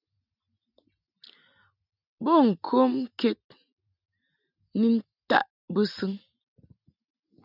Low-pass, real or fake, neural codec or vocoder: 5.4 kHz; real; none